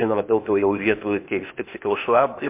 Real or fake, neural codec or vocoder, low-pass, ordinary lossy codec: fake; codec, 16 kHz, 0.8 kbps, ZipCodec; 3.6 kHz; MP3, 24 kbps